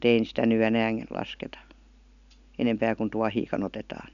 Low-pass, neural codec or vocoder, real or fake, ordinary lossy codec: 7.2 kHz; none; real; none